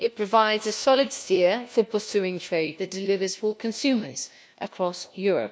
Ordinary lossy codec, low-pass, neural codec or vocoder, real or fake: none; none; codec, 16 kHz, 1 kbps, FunCodec, trained on LibriTTS, 50 frames a second; fake